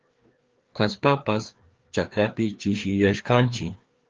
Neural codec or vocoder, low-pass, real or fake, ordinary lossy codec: codec, 16 kHz, 2 kbps, FreqCodec, larger model; 7.2 kHz; fake; Opus, 16 kbps